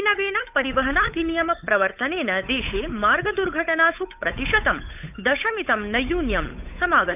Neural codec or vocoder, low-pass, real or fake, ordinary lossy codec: codec, 16 kHz, 8 kbps, FunCodec, trained on Chinese and English, 25 frames a second; 3.6 kHz; fake; none